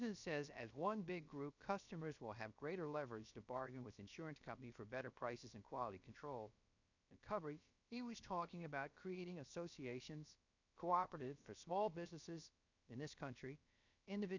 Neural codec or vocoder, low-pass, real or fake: codec, 16 kHz, about 1 kbps, DyCAST, with the encoder's durations; 7.2 kHz; fake